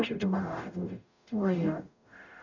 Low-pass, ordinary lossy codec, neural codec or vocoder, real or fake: 7.2 kHz; none; codec, 44.1 kHz, 0.9 kbps, DAC; fake